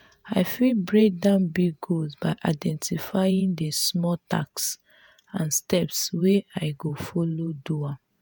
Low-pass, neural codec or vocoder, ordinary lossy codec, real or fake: none; vocoder, 48 kHz, 128 mel bands, Vocos; none; fake